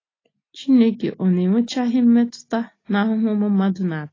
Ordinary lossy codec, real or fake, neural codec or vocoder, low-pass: AAC, 32 kbps; real; none; 7.2 kHz